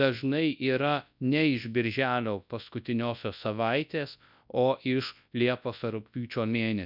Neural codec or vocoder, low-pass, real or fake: codec, 24 kHz, 0.9 kbps, WavTokenizer, large speech release; 5.4 kHz; fake